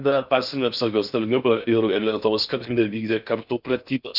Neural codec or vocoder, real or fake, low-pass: codec, 16 kHz in and 24 kHz out, 0.6 kbps, FocalCodec, streaming, 4096 codes; fake; 5.4 kHz